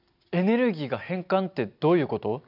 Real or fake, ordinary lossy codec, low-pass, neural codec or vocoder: real; none; 5.4 kHz; none